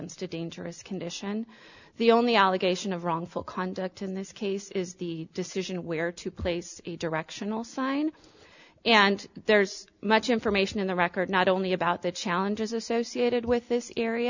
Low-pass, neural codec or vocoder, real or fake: 7.2 kHz; none; real